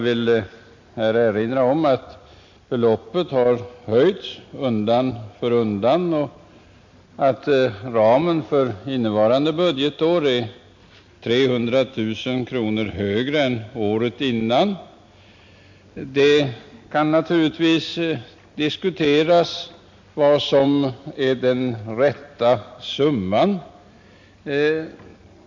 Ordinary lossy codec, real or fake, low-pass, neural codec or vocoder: MP3, 48 kbps; real; 7.2 kHz; none